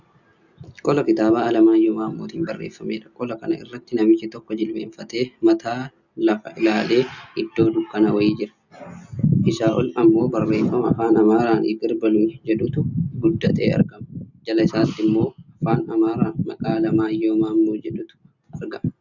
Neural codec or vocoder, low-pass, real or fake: none; 7.2 kHz; real